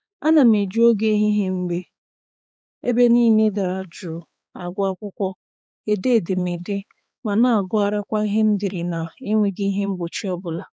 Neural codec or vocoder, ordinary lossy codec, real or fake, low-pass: codec, 16 kHz, 4 kbps, X-Codec, HuBERT features, trained on balanced general audio; none; fake; none